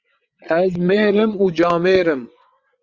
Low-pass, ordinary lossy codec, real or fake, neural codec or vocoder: 7.2 kHz; Opus, 64 kbps; fake; vocoder, 22.05 kHz, 80 mel bands, WaveNeXt